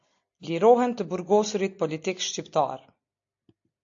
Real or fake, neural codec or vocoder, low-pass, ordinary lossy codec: real; none; 7.2 kHz; AAC, 48 kbps